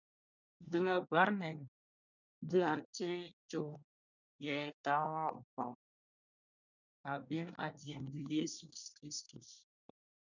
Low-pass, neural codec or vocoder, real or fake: 7.2 kHz; codec, 24 kHz, 1 kbps, SNAC; fake